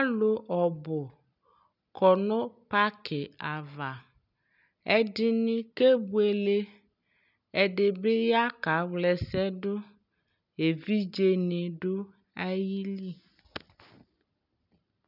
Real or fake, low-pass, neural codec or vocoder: real; 5.4 kHz; none